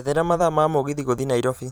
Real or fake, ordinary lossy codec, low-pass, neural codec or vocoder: fake; none; none; vocoder, 44.1 kHz, 128 mel bands every 512 samples, BigVGAN v2